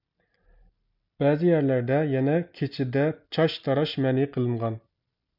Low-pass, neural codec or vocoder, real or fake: 5.4 kHz; none; real